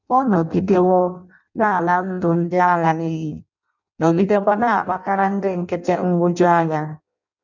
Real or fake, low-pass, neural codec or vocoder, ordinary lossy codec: fake; 7.2 kHz; codec, 16 kHz in and 24 kHz out, 0.6 kbps, FireRedTTS-2 codec; none